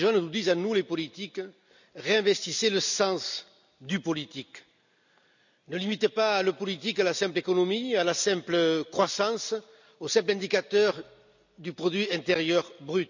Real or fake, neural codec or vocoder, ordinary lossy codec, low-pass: real; none; none; 7.2 kHz